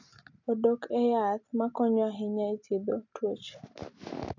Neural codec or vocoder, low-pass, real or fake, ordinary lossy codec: none; 7.2 kHz; real; none